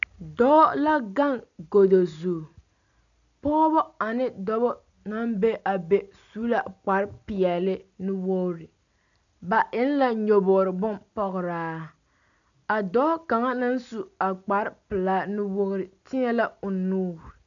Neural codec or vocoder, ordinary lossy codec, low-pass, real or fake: none; MP3, 96 kbps; 7.2 kHz; real